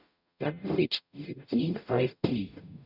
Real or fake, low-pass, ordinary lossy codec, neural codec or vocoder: fake; 5.4 kHz; none; codec, 44.1 kHz, 0.9 kbps, DAC